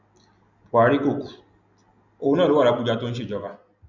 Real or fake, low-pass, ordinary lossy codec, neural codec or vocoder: real; 7.2 kHz; none; none